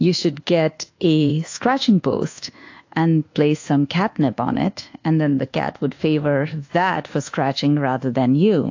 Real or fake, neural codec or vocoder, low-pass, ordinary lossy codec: fake; codec, 24 kHz, 1.2 kbps, DualCodec; 7.2 kHz; AAC, 48 kbps